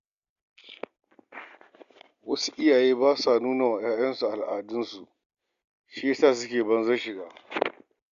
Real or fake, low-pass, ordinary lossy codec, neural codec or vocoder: real; 7.2 kHz; Opus, 64 kbps; none